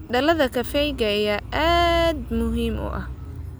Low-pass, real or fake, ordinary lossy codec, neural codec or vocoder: none; real; none; none